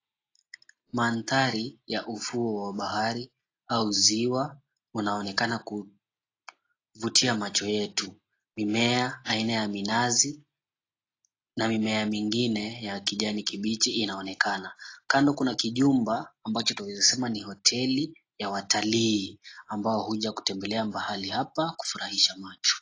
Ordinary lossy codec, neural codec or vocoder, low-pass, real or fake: AAC, 32 kbps; none; 7.2 kHz; real